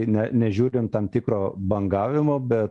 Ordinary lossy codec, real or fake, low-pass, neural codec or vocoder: MP3, 96 kbps; real; 10.8 kHz; none